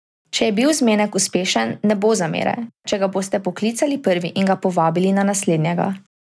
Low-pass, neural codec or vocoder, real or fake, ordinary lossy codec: none; none; real; none